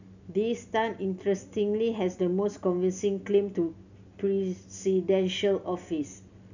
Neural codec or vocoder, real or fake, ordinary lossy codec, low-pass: none; real; none; 7.2 kHz